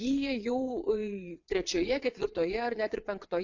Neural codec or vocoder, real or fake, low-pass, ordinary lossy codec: vocoder, 22.05 kHz, 80 mel bands, WaveNeXt; fake; 7.2 kHz; AAC, 48 kbps